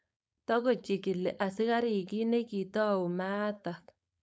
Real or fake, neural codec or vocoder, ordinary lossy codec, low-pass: fake; codec, 16 kHz, 4.8 kbps, FACodec; none; none